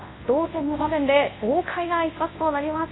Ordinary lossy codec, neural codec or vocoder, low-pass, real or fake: AAC, 16 kbps; codec, 24 kHz, 0.9 kbps, WavTokenizer, large speech release; 7.2 kHz; fake